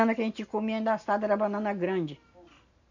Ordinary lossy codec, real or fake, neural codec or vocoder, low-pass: none; real; none; 7.2 kHz